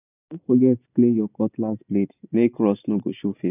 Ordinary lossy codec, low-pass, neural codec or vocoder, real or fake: none; 3.6 kHz; none; real